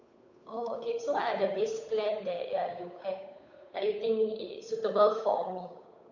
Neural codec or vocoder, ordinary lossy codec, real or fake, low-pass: codec, 16 kHz, 8 kbps, FunCodec, trained on Chinese and English, 25 frames a second; none; fake; 7.2 kHz